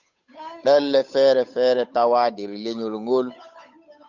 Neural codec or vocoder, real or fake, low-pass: codec, 16 kHz, 8 kbps, FunCodec, trained on Chinese and English, 25 frames a second; fake; 7.2 kHz